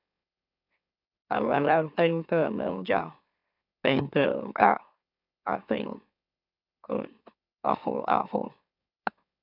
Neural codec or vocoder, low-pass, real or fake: autoencoder, 44.1 kHz, a latent of 192 numbers a frame, MeloTTS; 5.4 kHz; fake